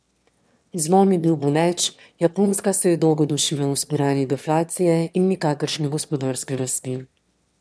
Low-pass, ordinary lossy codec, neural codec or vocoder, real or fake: none; none; autoencoder, 22.05 kHz, a latent of 192 numbers a frame, VITS, trained on one speaker; fake